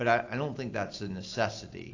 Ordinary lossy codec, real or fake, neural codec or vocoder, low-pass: AAC, 48 kbps; real; none; 7.2 kHz